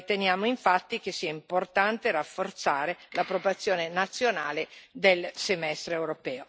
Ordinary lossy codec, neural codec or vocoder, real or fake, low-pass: none; none; real; none